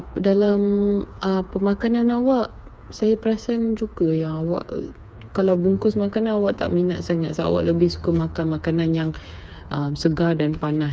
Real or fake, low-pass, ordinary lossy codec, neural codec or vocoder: fake; none; none; codec, 16 kHz, 4 kbps, FreqCodec, smaller model